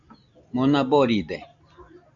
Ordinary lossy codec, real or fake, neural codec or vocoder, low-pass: AAC, 64 kbps; real; none; 7.2 kHz